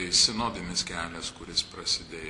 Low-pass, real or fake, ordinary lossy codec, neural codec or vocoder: 10.8 kHz; real; MP3, 64 kbps; none